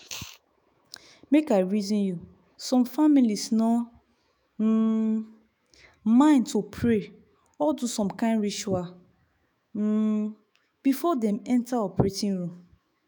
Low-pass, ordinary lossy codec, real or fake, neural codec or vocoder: none; none; fake; autoencoder, 48 kHz, 128 numbers a frame, DAC-VAE, trained on Japanese speech